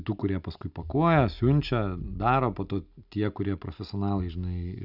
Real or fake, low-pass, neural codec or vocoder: real; 5.4 kHz; none